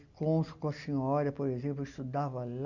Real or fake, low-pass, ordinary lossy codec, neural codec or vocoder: real; 7.2 kHz; none; none